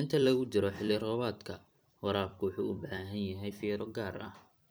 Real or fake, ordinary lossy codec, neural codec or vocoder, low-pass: fake; none; vocoder, 44.1 kHz, 128 mel bands every 256 samples, BigVGAN v2; none